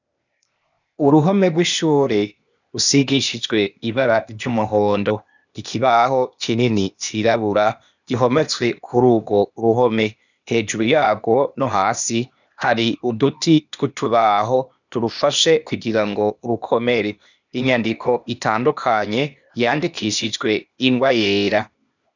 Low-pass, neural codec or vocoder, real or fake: 7.2 kHz; codec, 16 kHz, 0.8 kbps, ZipCodec; fake